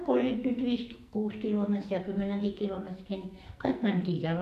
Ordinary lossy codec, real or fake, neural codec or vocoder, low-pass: none; fake; codec, 44.1 kHz, 2.6 kbps, SNAC; 14.4 kHz